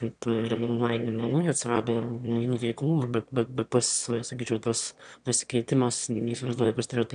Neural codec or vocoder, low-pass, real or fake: autoencoder, 22.05 kHz, a latent of 192 numbers a frame, VITS, trained on one speaker; 9.9 kHz; fake